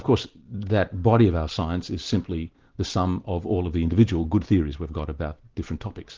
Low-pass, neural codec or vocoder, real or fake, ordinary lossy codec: 7.2 kHz; none; real; Opus, 16 kbps